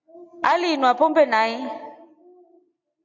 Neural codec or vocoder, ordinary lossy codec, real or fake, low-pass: none; AAC, 48 kbps; real; 7.2 kHz